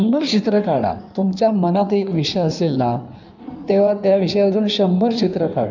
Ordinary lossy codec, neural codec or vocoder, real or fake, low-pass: none; codec, 16 kHz in and 24 kHz out, 1.1 kbps, FireRedTTS-2 codec; fake; 7.2 kHz